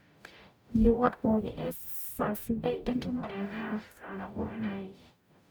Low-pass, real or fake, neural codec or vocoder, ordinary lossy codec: none; fake; codec, 44.1 kHz, 0.9 kbps, DAC; none